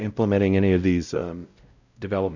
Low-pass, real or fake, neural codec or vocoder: 7.2 kHz; fake; codec, 16 kHz, 0.5 kbps, X-Codec, WavLM features, trained on Multilingual LibriSpeech